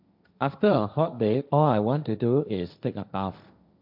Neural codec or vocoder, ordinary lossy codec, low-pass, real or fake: codec, 16 kHz, 1.1 kbps, Voila-Tokenizer; none; 5.4 kHz; fake